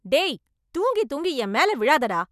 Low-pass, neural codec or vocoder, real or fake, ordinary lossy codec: 14.4 kHz; none; real; none